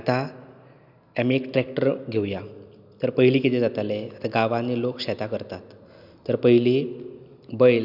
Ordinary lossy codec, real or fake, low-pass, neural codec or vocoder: none; real; 5.4 kHz; none